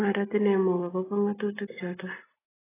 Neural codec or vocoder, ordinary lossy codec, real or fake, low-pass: none; AAC, 16 kbps; real; 3.6 kHz